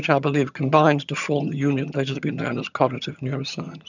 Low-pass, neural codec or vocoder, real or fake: 7.2 kHz; vocoder, 22.05 kHz, 80 mel bands, HiFi-GAN; fake